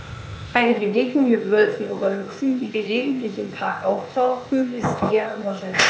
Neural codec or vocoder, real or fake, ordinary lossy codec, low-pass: codec, 16 kHz, 0.8 kbps, ZipCodec; fake; none; none